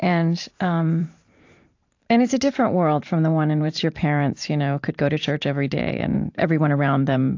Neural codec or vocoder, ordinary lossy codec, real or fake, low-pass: vocoder, 44.1 kHz, 128 mel bands every 512 samples, BigVGAN v2; AAC, 48 kbps; fake; 7.2 kHz